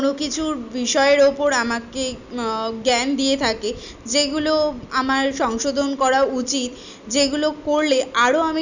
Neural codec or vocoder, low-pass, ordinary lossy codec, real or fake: none; 7.2 kHz; none; real